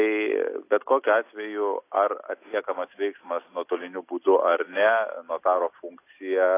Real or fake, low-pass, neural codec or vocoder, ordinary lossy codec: real; 3.6 kHz; none; AAC, 24 kbps